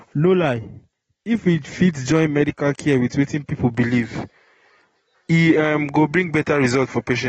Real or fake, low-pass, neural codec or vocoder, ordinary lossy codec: real; 19.8 kHz; none; AAC, 24 kbps